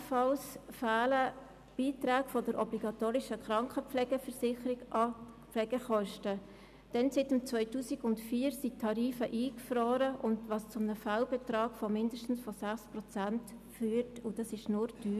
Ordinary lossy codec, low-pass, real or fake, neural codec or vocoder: none; 14.4 kHz; real; none